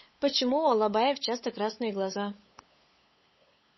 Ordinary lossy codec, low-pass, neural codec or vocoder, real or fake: MP3, 24 kbps; 7.2 kHz; codec, 16 kHz, 8 kbps, FunCodec, trained on LibriTTS, 25 frames a second; fake